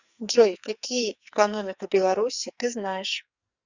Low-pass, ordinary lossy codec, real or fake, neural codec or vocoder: 7.2 kHz; Opus, 64 kbps; fake; codec, 44.1 kHz, 2.6 kbps, SNAC